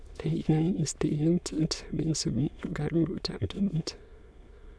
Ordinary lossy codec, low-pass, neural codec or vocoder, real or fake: none; none; autoencoder, 22.05 kHz, a latent of 192 numbers a frame, VITS, trained on many speakers; fake